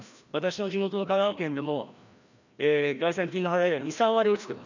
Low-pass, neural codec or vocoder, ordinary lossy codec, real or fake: 7.2 kHz; codec, 16 kHz, 1 kbps, FreqCodec, larger model; none; fake